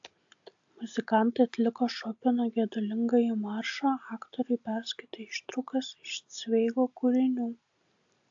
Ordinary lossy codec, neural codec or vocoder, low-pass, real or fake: AAC, 64 kbps; none; 7.2 kHz; real